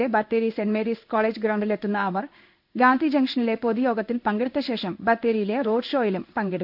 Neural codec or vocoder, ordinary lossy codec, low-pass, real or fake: codec, 16 kHz in and 24 kHz out, 1 kbps, XY-Tokenizer; none; 5.4 kHz; fake